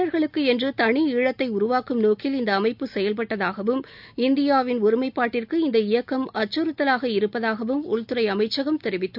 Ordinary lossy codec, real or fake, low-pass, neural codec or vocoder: none; real; 5.4 kHz; none